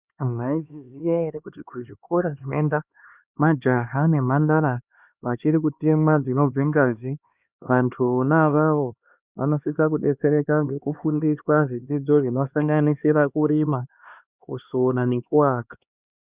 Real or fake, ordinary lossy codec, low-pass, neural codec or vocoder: fake; Opus, 64 kbps; 3.6 kHz; codec, 16 kHz, 2 kbps, X-Codec, HuBERT features, trained on LibriSpeech